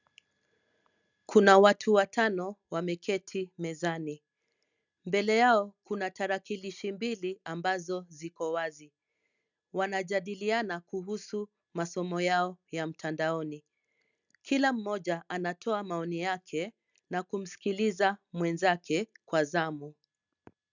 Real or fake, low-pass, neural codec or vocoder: real; 7.2 kHz; none